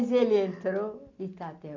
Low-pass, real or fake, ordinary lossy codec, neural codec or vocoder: 7.2 kHz; real; none; none